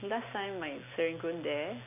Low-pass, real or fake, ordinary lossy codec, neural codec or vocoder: 3.6 kHz; real; none; none